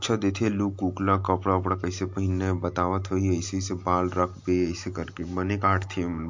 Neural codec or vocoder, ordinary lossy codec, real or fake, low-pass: none; MP3, 48 kbps; real; 7.2 kHz